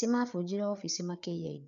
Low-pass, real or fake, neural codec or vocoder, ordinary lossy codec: 7.2 kHz; fake; codec, 16 kHz, 4 kbps, FreqCodec, larger model; none